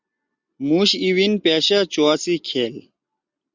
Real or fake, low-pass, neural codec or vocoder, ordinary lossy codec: real; 7.2 kHz; none; Opus, 64 kbps